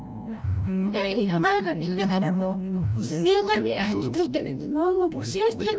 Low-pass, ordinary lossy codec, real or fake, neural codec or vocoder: none; none; fake; codec, 16 kHz, 0.5 kbps, FreqCodec, larger model